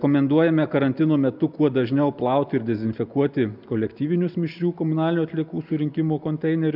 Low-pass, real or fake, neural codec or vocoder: 5.4 kHz; real; none